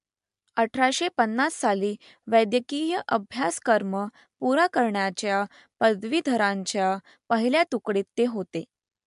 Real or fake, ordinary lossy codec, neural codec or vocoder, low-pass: real; MP3, 64 kbps; none; 10.8 kHz